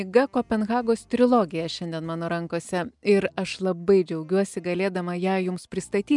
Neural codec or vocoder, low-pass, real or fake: none; 10.8 kHz; real